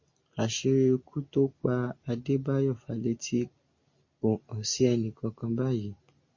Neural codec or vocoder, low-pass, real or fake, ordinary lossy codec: none; 7.2 kHz; real; MP3, 32 kbps